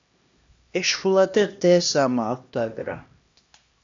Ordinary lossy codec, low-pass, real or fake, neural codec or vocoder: AAC, 64 kbps; 7.2 kHz; fake; codec, 16 kHz, 1 kbps, X-Codec, HuBERT features, trained on LibriSpeech